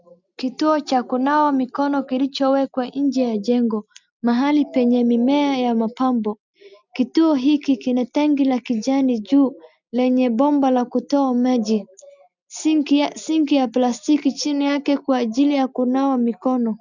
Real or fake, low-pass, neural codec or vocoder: real; 7.2 kHz; none